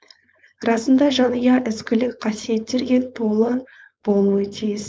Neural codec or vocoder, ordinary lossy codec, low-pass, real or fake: codec, 16 kHz, 4.8 kbps, FACodec; none; none; fake